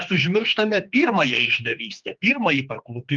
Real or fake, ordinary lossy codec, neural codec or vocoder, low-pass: fake; Opus, 32 kbps; codec, 16 kHz, 2 kbps, X-Codec, HuBERT features, trained on general audio; 7.2 kHz